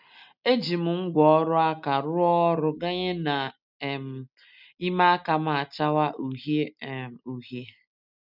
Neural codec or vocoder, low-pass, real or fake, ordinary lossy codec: none; 5.4 kHz; real; none